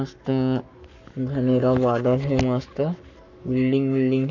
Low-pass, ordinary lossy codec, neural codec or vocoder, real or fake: 7.2 kHz; none; codec, 44.1 kHz, 7.8 kbps, Pupu-Codec; fake